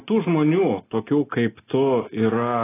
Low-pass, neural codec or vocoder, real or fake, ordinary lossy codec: 3.6 kHz; none; real; AAC, 16 kbps